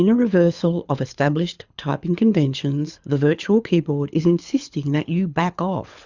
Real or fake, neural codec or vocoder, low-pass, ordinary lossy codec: fake; codec, 24 kHz, 6 kbps, HILCodec; 7.2 kHz; Opus, 64 kbps